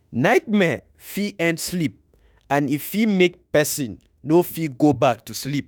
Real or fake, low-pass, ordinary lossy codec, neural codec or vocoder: fake; none; none; autoencoder, 48 kHz, 32 numbers a frame, DAC-VAE, trained on Japanese speech